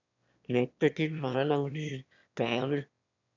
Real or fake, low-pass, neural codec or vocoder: fake; 7.2 kHz; autoencoder, 22.05 kHz, a latent of 192 numbers a frame, VITS, trained on one speaker